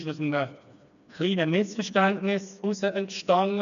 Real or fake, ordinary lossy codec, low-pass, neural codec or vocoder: fake; none; 7.2 kHz; codec, 16 kHz, 2 kbps, FreqCodec, smaller model